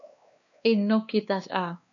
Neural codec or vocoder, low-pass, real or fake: codec, 16 kHz, 2 kbps, X-Codec, WavLM features, trained on Multilingual LibriSpeech; 7.2 kHz; fake